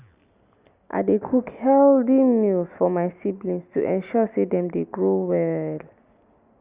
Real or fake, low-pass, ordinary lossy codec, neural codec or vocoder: real; 3.6 kHz; Opus, 64 kbps; none